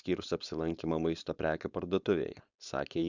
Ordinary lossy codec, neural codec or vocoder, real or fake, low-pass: Opus, 64 kbps; codec, 16 kHz, 4.8 kbps, FACodec; fake; 7.2 kHz